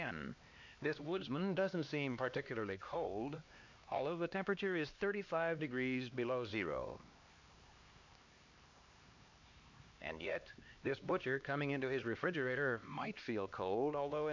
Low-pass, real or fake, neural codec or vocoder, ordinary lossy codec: 7.2 kHz; fake; codec, 16 kHz, 2 kbps, X-Codec, HuBERT features, trained on LibriSpeech; MP3, 48 kbps